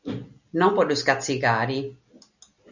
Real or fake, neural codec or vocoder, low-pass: real; none; 7.2 kHz